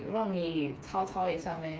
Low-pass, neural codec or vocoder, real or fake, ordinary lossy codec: none; codec, 16 kHz, 4 kbps, FreqCodec, smaller model; fake; none